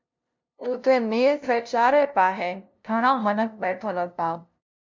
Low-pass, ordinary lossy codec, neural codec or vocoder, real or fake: 7.2 kHz; MP3, 64 kbps; codec, 16 kHz, 0.5 kbps, FunCodec, trained on LibriTTS, 25 frames a second; fake